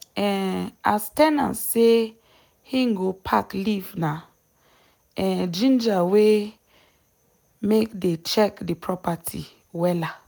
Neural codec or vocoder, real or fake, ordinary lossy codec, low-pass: none; real; none; none